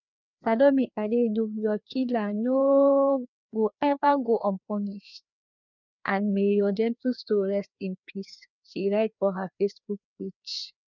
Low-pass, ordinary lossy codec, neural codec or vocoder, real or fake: 7.2 kHz; none; codec, 16 kHz, 2 kbps, FreqCodec, larger model; fake